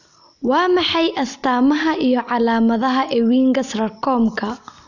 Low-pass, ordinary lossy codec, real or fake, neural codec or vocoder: 7.2 kHz; none; real; none